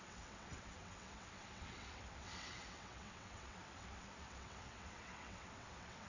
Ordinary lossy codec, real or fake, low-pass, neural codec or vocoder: none; fake; 7.2 kHz; vocoder, 22.05 kHz, 80 mel bands, WaveNeXt